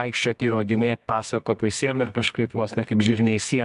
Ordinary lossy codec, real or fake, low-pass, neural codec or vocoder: MP3, 96 kbps; fake; 10.8 kHz; codec, 24 kHz, 0.9 kbps, WavTokenizer, medium music audio release